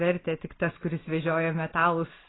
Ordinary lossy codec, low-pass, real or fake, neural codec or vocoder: AAC, 16 kbps; 7.2 kHz; real; none